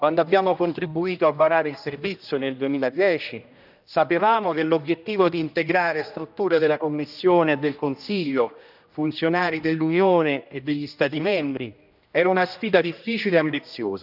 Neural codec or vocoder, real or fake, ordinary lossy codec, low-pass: codec, 16 kHz, 1 kbps, X-Codec, HuBERT features, trained on general audio; fake; none; 5.4 kHz